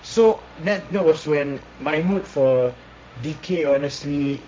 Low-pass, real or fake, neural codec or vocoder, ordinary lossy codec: none; fake; codec, 16 kHz, 1.1 kbps, Voila-Tokenizer; none